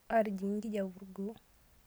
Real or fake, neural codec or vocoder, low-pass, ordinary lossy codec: real; none; none; none